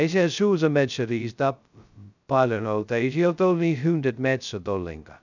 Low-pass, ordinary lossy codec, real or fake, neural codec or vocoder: 7.2 kHz; none; fake; codec, 16 kHz, 0.2 kbps, FocalCodec